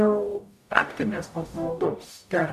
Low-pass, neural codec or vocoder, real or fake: 14.4 kHz; codec, 44.1 kHz, 0.9 kbps, DAC; fake